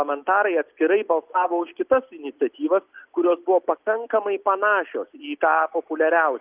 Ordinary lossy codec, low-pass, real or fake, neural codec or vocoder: Opus, 24 kbps; 3.6 kHz; real; none